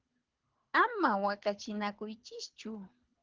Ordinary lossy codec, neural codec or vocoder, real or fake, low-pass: Opus, 16 kbps; codec, 24 kHz, 6 kbps, HILCodec; fake; 7.2 kHz